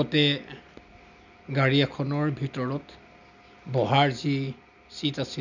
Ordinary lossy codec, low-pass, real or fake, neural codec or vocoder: none; 7.2 kHz; real; none